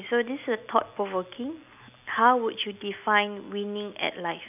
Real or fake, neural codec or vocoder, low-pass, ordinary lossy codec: real; none; 3.6 kHz; none